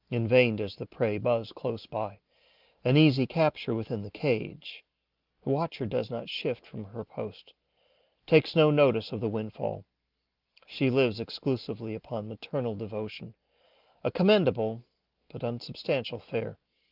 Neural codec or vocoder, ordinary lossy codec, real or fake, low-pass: none; Opus, 32 kbps; real; 5.4 kHz